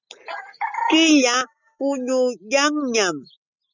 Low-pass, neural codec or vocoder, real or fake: 7.2 kHz; none; real